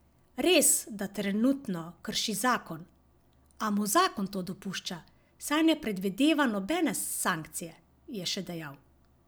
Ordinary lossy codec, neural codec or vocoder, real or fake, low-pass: none; none; real; none